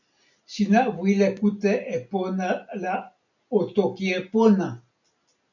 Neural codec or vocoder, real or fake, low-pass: none; real; 7.2 kHz